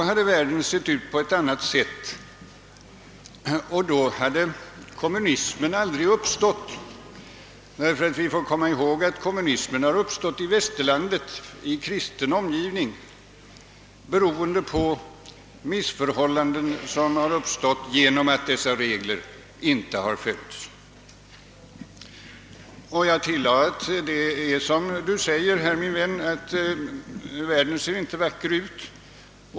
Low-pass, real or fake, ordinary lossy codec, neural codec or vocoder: none; real; none; none